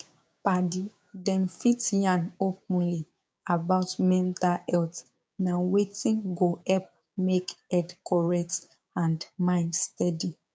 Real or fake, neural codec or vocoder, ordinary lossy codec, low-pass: fake; codec, 16 kHz, 6 kbps, DAC; none; none